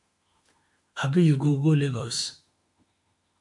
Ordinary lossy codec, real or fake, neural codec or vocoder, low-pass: MP3, 64 kbps; fake; autoencoder, 48 kHz, 32 numbers a frame, DAC-VAE, trained on Japanese speech; 10.8 kHz